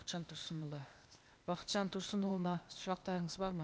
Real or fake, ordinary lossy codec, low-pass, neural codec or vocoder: fake; none; none; codec, 16 kHz, 0.8 kbps, ZipCodec